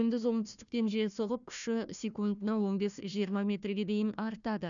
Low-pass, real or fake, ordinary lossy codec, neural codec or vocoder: 7.2 kHz; fake; none; codec, 16 kHz, 1 kbps, FunCodec, trained on Chinese and English, 50 frames a second